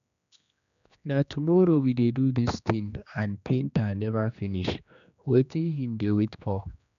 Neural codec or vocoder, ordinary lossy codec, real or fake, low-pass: codec, 16 kHz, 2 kbps, X-Codec, HuBERT features, trained on general audio; none; fake; 7.2 kHz